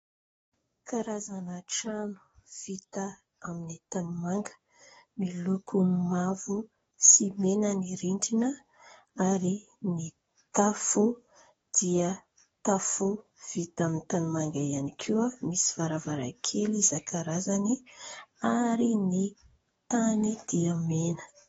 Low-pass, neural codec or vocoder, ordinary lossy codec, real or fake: 19.8 kHz; codec, 44.1 kHz, 7.8 kbps, DAC; AAC, 24 kbps; fake